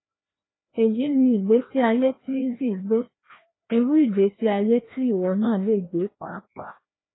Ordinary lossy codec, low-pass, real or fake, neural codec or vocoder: AAC, 16 kbps; 7.2 kHz; fake; codec, 16 kHz, 1 kbps, FreqCodec, larger model